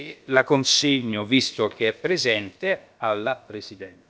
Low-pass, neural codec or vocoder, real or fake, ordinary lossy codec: none; codec, 16 kHz, about 1 kbps, DyCAST, with the encoder's durations; fake; none